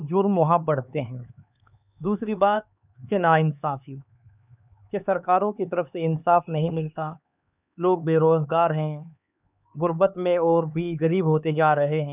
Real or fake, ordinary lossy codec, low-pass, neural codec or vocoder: fake; none; 3.6 kHz; codec, 16 kHz, 4 kbps, X-Codec, HuBERT features, trained on LibriSpeech